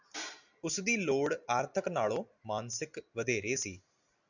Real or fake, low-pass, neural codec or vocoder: real; 7.2 kHz; none